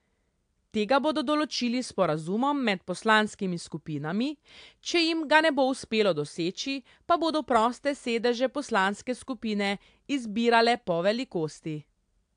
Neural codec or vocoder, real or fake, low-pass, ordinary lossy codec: none; real; 9.9 kHz; AAC, 64 kbps